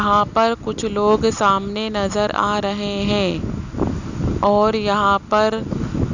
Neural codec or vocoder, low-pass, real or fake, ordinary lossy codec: none; 7.2 kHz; real; none